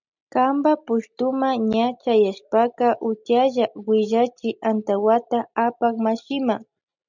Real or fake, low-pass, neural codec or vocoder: real; 7.2 kHz; none